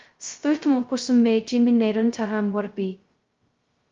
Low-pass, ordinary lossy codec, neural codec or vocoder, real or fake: 7.2 kHz; Opus, 32 kbps; codec, 16 kHz, 0.2 kbps, FocalCodec; fake